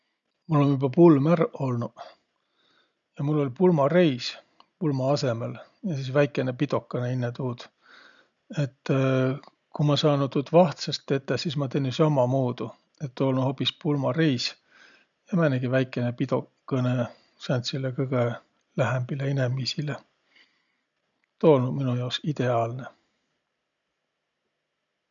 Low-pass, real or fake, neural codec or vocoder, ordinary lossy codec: 7.2 kHz; real; none; none